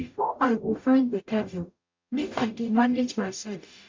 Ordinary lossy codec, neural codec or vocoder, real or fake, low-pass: MP3, 64 kbps; codec, 44.1 kHz, 0.9 kbps, DAC; fake; 7.2 kHz